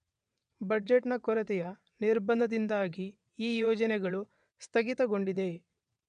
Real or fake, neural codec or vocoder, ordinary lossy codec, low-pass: fake; vocoder, 22.05 kHz, 80 mel bands, WaveNeXt; none; 9.9 kHz